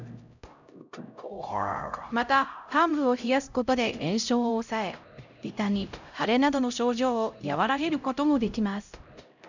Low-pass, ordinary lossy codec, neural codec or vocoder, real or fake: 7.2 kHz; none; codec, 16 kHz, 0.5 kbps, X-Codec, HuBERT features, trained on LibriSpeech; fake